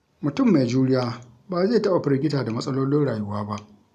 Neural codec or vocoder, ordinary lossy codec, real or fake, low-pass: none; none; real; 14.4 kHz